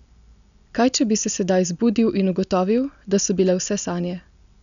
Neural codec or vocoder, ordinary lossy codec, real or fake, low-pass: none; none; real; 7.2 kHz